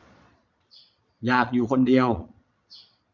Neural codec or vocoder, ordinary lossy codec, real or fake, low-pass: vocoder, 22.05 kHz, 80 mel bands, Vocos; none; fake; 7.2 kHz